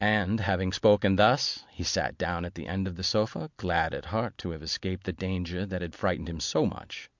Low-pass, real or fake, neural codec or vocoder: 7.2 kHz; real; none